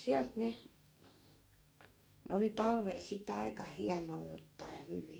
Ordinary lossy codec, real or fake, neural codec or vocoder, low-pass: none; fake; codec, 44.1 kHz, 2.6 kbps, DAC; none